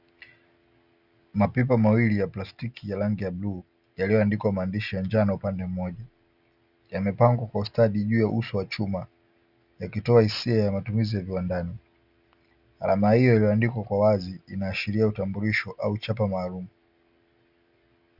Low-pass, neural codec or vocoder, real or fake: 5.4 kHz; none; real